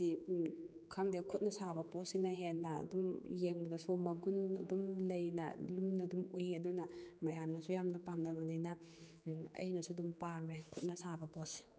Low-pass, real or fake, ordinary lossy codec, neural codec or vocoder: none; fake; none; codec, 16 kHz, 4 kbps, X-Codec, HuBERT features, trained on general audio